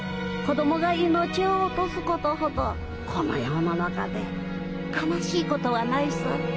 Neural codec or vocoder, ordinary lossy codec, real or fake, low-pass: none; none; real; none